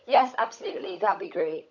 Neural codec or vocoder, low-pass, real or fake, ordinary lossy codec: codec, 16 kHz, 16 kbps, FunCodec, trained on LibriTTS, 50 frames a second; 7.2 kHz; fake; none